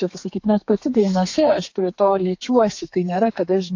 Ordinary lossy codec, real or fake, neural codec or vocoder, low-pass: AAC, 48 kbps; fake; codec, 32 kHz, 1.9 kbps, SNAC; 7.2 kHz